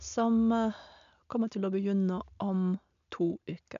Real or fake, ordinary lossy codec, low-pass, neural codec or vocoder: real; none; 7.2 kHz; none